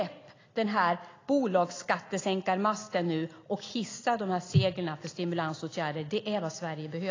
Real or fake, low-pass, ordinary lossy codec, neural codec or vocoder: real; 7.2 kHz; AAC, 32 kbps; none